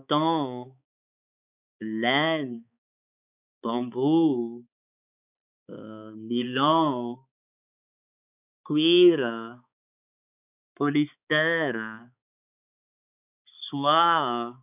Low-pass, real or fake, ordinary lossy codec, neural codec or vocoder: 3.6 kHz; fake; none; codec, 16 kHz, 4 kbps, X-Codec, HuBERT features, trained on balanced general audio